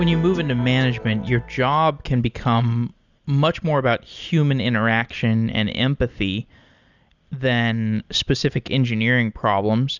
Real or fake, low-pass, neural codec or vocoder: real; 7.2 kHz; none